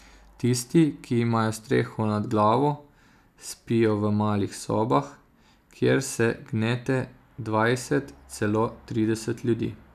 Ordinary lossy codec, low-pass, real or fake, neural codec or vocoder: none; 14.4 kHz; real; none